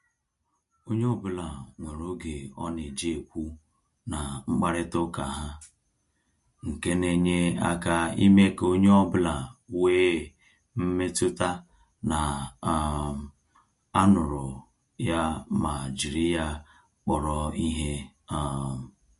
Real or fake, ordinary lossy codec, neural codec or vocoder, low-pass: real; MP3, 48 kbps; none; 14.4 kHz